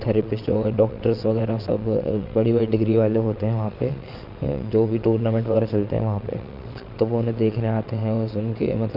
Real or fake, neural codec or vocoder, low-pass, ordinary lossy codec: fake; vocoder, 22.05 kHz, 80 mel bands, WaveNeXt; 5.4 kHz; none